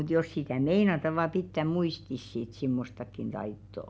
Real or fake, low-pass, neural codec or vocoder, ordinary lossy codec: real; none; none; none